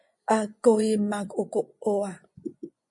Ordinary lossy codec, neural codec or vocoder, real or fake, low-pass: MP3, 48 kbps; vocoder, 24 kHz, 100 mel bands, Vocos; fake; 10.8 kHz